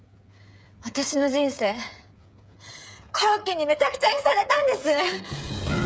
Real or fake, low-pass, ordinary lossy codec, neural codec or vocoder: fake; none; none; codec, 16 kHz, 8 kbps, FreqCodec, smaller model